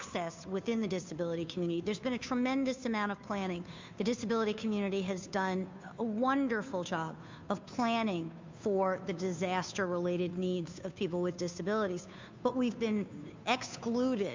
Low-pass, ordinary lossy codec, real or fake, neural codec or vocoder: 7.2 kHz; MP3, 64 kbps; fake; codec, 16 kHz, 2 kbps, FunCodec, trained on Chinese and English, 25 frames a second